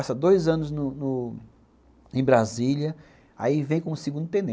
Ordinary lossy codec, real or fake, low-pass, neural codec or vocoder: none; real; none; none